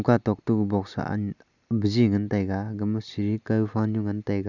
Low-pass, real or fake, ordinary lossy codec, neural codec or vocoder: 7.2 kHz; real; none; none